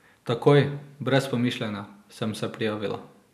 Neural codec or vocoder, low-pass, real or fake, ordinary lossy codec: none; 14.4 kHz; real; none